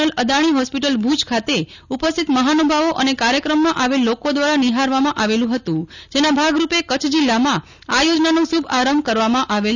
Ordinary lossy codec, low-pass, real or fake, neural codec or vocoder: none; 7.2 kHz; real; none